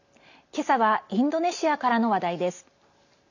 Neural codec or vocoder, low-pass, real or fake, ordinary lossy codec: none; 7.2 kHz; real; none